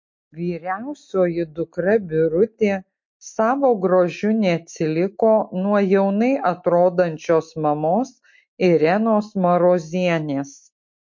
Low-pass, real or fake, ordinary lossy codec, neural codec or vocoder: 7.2 kHz; real; MP3, 48 kbps; none